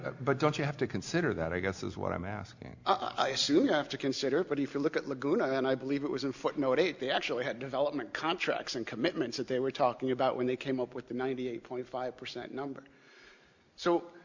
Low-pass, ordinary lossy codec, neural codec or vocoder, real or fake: 7.2 kHz; Opus, 64 kbps; none; real